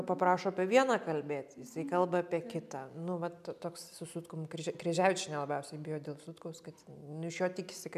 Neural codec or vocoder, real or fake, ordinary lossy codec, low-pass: none; real; MP3, 96 kbps; 14.4 kHz